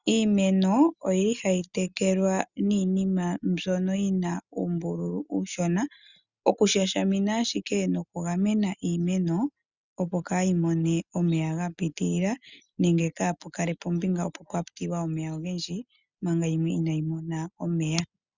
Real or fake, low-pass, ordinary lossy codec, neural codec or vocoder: real; 7.2 kHz; Opus, 64 kbps; none